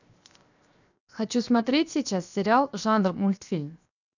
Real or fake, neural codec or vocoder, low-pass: fake; codec, 16 kHz, 0.7 kbps, FocalCodec; 7.2 kHz